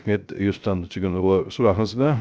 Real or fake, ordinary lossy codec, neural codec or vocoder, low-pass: fake; none; codec, 16 kHz, 0.3 kbps, FocalCodec; none